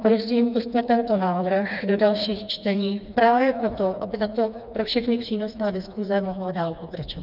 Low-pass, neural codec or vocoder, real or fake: 5.4 kHz; codec, 16 kHz, 2 kbps, FreqCodec, smaller model; fake